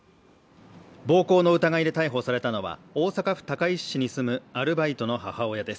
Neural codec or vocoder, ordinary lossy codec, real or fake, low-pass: none; none; real; none